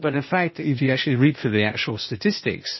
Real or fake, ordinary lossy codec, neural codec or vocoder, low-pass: fake; MP3, 24 kbps; codec, 16 kHz, 0.8 kbps, ZipCodec; 7.2 kHz